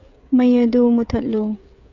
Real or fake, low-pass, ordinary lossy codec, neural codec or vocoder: fake; 7.2 kHz; none; codec, 16 kHz, 8 kbps, FreqCodec, smaller model